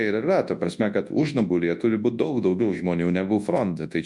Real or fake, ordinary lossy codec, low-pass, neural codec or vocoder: fake; MP3, 64 kbps; 10.8 kHz; codec, 24 kHz, 0.9 kbps, WavTokenizer, large speech release